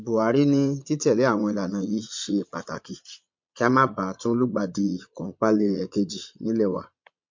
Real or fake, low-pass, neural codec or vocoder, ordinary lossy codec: fake; 7.2 kHz; vocoder, 22.05 kHz, 80 mel bands, Vocos; MP3, 48 kbps